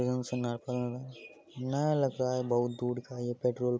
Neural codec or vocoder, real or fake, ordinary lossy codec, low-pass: none; real; none; none